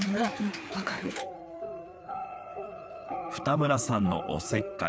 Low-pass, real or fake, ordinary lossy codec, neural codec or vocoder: none; fake; none; codec, 16 kHz, 4 kbps, FreqCodec, larger model